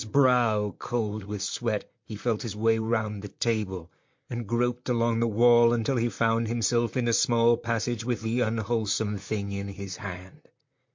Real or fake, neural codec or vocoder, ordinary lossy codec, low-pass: fake; vocoder, 44.1 kHz, 128 mel bands, Pupu-Vocoder; MP3, 48 kbps; 7.2 kHz